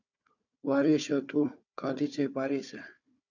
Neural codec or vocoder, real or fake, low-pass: codec, 16 kHz, 4 kbps, FunCodec, trained on Chinese and English, 50 frames a second; fake; 7.2 kHz